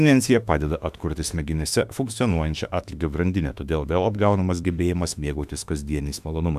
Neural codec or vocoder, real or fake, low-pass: autoencoder, 48 kHz, 32 numbers a frame, DAC-VAE, trained on Japanese speech; fake; 14.4 kHz